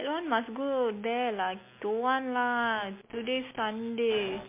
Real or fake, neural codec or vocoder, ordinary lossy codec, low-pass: real; none; MP3, 24 kbps; 3.6 kHz